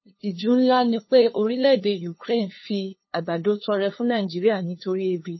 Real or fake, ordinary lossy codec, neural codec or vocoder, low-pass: fake; MP3, 24 kbps; codec, 16 kHz, 2 kbps, FunCodec, trained on LibriTTS, 25 frames a second; 7.2 kHz